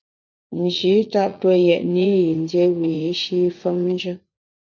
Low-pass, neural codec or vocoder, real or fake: 7.2 kHz; vocoder, 44.1 kHz, 80 mel bands, Vocos; fake